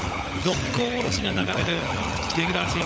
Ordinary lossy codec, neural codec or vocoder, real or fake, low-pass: none; codec, 16 kHz, 16 kbps, FunCodec, trained on LibriTTS, 50 frames a second; fake; none